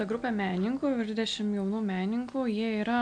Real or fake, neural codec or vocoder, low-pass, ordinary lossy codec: real; none; 9.9 kHz; MP3, 64 kbps